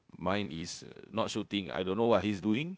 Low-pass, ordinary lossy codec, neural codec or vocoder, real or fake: none; none; codec, 16 kHz, 0.8 kbps, ZipCodec; fake